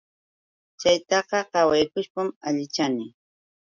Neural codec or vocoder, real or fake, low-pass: none; real; 7.2 kHz